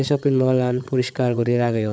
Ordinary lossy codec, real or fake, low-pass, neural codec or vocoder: none; fake; none; codec, 16 kHz, 8 kbps, FreqCodec, larger model